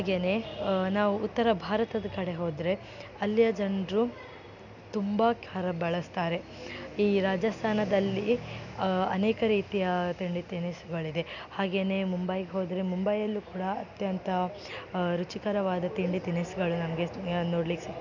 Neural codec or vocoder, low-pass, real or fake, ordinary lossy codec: none; 7.2 kHz; real; none